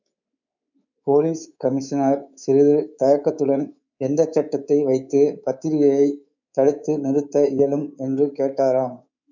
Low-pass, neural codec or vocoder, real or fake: 7.2 kHz; codec, 24 kHz, 3.1 kbps, DualCodec; fake